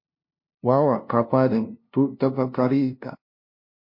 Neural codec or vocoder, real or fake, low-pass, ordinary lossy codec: codec, 16 kHz, 0.5 kbps, FunCodec, trained on LibriTTS, 25 frames a second; fake; 5.4 kHz; MP3, 32 kbps